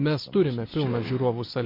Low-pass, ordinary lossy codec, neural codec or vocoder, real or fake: 5.4 kHz; MP3, 32 kbps; none; real